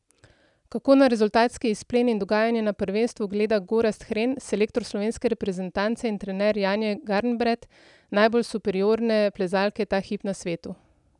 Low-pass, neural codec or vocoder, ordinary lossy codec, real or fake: 10.8 kHz; none; none; real